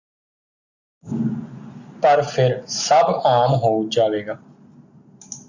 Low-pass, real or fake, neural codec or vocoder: 7.2 kHz; real; none